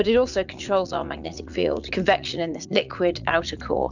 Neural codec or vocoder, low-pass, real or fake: vocoder, 44.1 kHz, 80 mel bands, Vocos; 7.2 kHz; fake